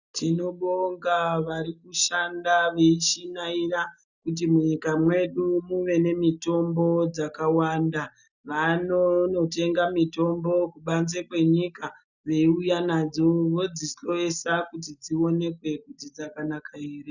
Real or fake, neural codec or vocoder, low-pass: real; none; 7.2 kHz